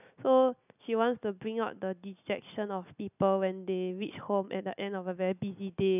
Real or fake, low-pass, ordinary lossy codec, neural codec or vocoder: real; 3.6 kHz; none; none